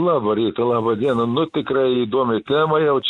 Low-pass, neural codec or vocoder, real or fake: 10.8 kHz; none; real